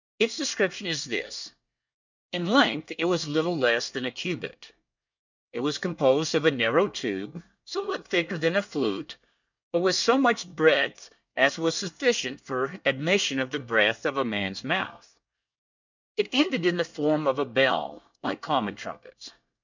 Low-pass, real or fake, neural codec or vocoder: 7.2 kHz; fake; codec, 24 kHz, 1 kbps, SNAC